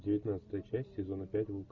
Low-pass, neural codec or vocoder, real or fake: 7.2 kHz; none; real